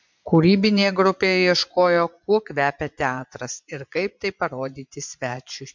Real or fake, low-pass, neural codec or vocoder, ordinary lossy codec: fake; 7.2 kHz; vocoder, 24 kHz, 100 mel bands, Vocos; MP3, 64 kbps